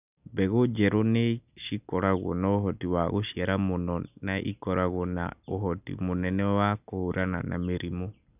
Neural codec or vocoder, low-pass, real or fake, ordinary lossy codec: none; 3.6 kHz; real; none